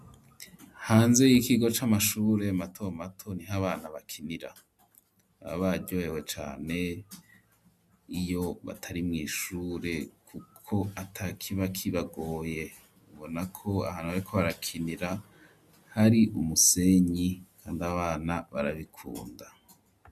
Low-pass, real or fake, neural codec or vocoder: 14.4 kHz; real; none